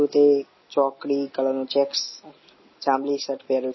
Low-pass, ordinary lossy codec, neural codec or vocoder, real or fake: 7.2 kHz; MP3, 24 kbps; none; real